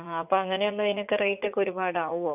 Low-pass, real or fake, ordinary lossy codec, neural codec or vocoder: 3.6 kHz; fake; none; vocoder, 44.1 kHz, 80 mel bands, Vocos